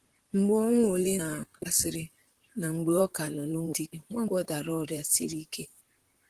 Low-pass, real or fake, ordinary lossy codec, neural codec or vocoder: 14.4 kHz; fake; Opus, 16 kbps; vocoder, 44.1 kHz, 128 mel bands, Pupu-Vocoder